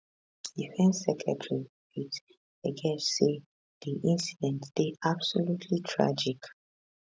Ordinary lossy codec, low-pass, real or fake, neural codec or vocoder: none; none; real; none